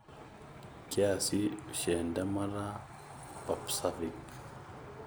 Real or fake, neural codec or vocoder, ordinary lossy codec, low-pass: real; none; none; none